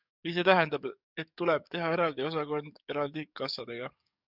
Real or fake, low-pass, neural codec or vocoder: fake; 5.4 kHz; codec, 44.1 kHz, 7.8 kbps, DAC